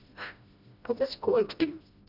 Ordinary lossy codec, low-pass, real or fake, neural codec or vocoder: none; 5.4 kHz; fake; codec, 16 kHz, 1 kbps, FreqCodec, smaller model